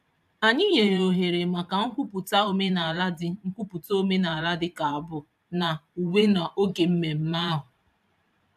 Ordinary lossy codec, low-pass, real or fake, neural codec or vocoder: AAC, 96 kbps; 14.4 kHz; fake; vocoder, 44.1 kHz, 128 mel bands every 512 samples, BigVGAN v2